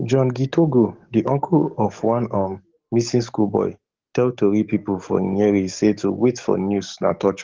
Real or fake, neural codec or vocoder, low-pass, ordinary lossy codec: fake; codec, 44.1 kHz, 7.8 kbps, DAC; 7.2 kHz; Opus, 16 kbps